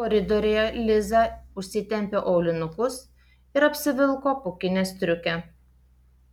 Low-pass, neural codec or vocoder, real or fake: 19.8 kHz; none; real